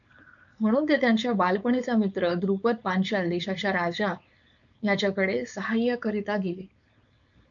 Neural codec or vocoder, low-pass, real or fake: codec, 16 kHz, 4.8 kbps, FACodec; 7.2 kHz; fake